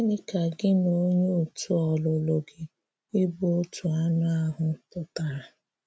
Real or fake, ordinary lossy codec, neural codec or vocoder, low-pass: real; none; none; none